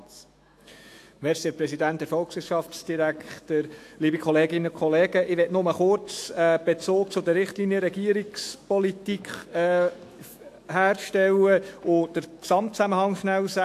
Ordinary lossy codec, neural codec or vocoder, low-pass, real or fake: AAC, 64 kbps; autoencoder, 48 kHz, 128 numbers a frame, DAC-VAE, trained on Japanese speech; 14.4 kHz; fake